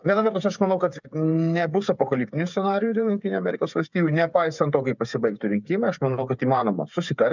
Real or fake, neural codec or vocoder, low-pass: fake; codec, 16 kHz, 8 kbps, FreqCodec, smaller model; 7.2 kHz